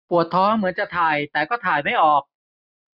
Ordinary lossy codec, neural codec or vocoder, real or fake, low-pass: none; none; real; 5.4 kHz